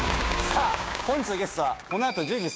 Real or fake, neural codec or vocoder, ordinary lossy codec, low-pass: fake; codec, 16 kHz, 6 kbps, DAC; none; none